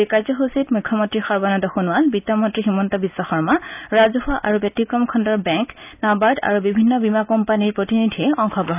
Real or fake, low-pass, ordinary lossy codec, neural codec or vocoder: real; 3.6 kHz; none; none